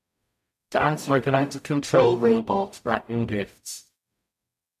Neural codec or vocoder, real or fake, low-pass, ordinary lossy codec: codec, 44.1 kHz, 0.9 kbps, DAC; fake; 14.4 kHz; MP3, 96 kbps